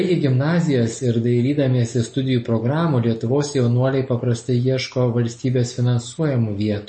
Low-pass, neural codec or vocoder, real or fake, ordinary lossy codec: 9.9 kHz; none; real; MP3, 32 kbps